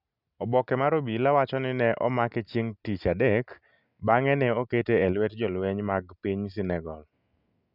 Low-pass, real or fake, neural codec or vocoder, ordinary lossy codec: 5.4 kHz; real; none; none